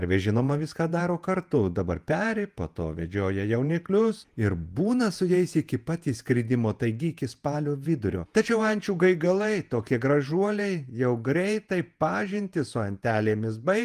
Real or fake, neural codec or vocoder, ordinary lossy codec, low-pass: fake; vocoder, 48 kHz, 128 mel bands, Vocos; Opus, 32 kbps; 14.4 kHz